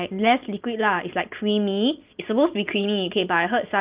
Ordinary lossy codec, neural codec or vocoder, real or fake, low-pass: Opus, 32 kbps; none; real; 3.6 kHz